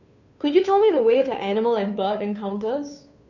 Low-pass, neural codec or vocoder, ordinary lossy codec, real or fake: 7.2 kHz; codec, 16 kHz, 2 kbps, FunCodec, trained on Chinese and English, 25 frames a second; none; fake